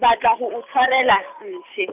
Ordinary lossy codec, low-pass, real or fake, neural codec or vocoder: none; 3.6 kHz; real; none